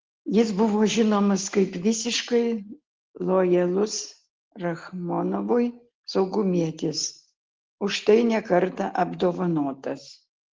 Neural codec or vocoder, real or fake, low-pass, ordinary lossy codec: none; real; 7.2 kHz; Opus, 16 kbps